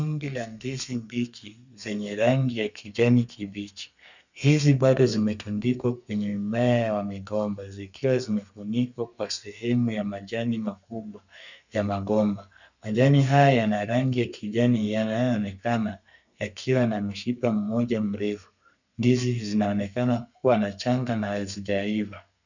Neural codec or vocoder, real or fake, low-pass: codec, 32 kHz, 1.9 kbps, SNAC; fake; 7.2 kHz